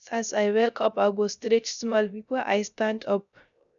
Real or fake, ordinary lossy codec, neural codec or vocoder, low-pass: fake; none; codec, 16 kHz, 0.3 kbps, FocalCodec; 7.2 kHz